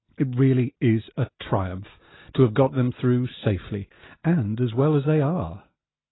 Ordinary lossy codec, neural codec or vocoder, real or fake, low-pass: AAC, 16 kbps; none; real; 7.2 kHz